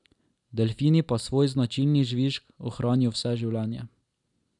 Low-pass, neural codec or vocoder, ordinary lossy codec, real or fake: 10.8 kHz; none; none; real